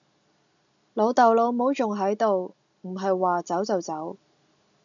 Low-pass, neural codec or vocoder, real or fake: 7.2 kHz; none; real